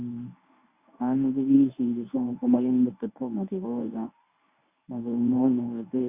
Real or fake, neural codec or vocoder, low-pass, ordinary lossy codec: fake; codec, 24 kHz, 0.9 kbps, WavTokenizer, medium speech release version 1; 3.6 kHz; Opus, 64 kbps